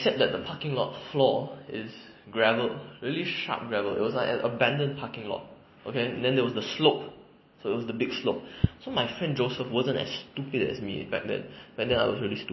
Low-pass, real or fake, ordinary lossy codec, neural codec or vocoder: 7.2 kHz; real; MP3, 24 kbps; none